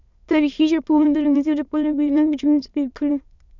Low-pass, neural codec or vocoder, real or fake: 7.2 kHz; autoencoder, 22.05 kHz, a latent of 192 numbers a frame, VITS, trained on many speakers; fake